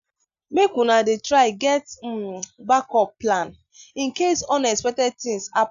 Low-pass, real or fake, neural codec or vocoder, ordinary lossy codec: 7.2 kHz; real; none; none